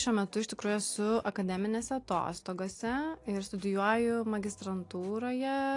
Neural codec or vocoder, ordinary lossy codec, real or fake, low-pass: none; AAC, 48 kbps; real; 10.8 kHz